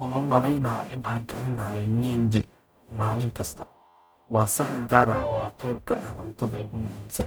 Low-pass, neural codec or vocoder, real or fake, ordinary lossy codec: none; codec, 44.1 kHz, 0.9 kbps, DAC; fake; none